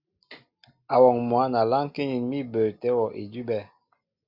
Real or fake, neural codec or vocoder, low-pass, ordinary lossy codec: real; none; 5.4 kHz; Opus, 64 kbps